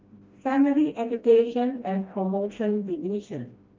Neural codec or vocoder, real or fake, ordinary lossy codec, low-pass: codec, 16 kHz, 1 kbps, FreqCodec, smaller model; fake; Opus, 32 kbps; 7.2 kHz